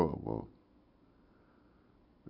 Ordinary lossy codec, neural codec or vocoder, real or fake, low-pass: none; none; real; 5.4 kHz